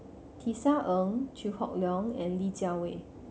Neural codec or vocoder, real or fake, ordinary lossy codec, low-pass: none; real; none; none